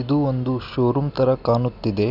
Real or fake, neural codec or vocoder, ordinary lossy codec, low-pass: real; none; none; 5.4 kHz